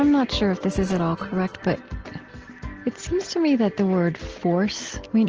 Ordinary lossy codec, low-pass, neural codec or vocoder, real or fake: Opus, 16 kbps; 7.2 kHz; none; real